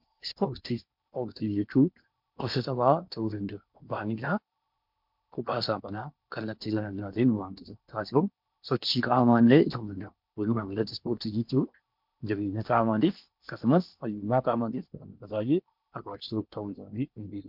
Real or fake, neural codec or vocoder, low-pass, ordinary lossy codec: fake; codec, 16 kHz in and 24 kHz out, 0.8 kbps, FocalCodec, streaming, 65536 codes; 5.4 kHz; MP3, 48 kbps